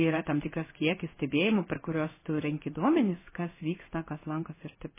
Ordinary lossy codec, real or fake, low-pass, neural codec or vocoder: MP3, 16 kbps; fake; 3.6 kHz; codec, 16 kHz in and 24 kHz out, 1 kbps, XY-Tokenizer